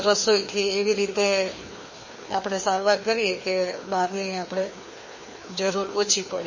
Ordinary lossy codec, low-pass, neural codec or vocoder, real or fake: MP3, 32 kbps; 7.2 kHz; codec, 16 kHz, 2 kbps, FreqCodec, larger model; fake